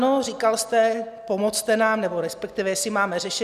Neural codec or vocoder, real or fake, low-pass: none; real; 14.4 kHz